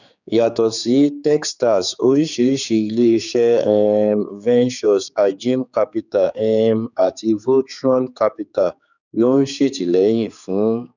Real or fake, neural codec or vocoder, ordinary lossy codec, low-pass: fake; codec, 16 kHz, 4 kbps, X-Codec, HuBERT features, trained on general audio; none; 7.2 kHz